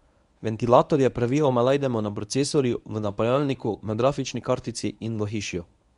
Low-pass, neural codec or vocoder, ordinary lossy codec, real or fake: 10.8 kHz; codec, 24 kHz, 0.9 kbps, WavTokenizer, medium speech release version 1; none; fake